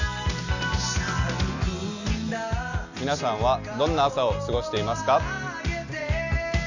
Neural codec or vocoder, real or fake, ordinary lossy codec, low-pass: none; real; none; 7.2 kHz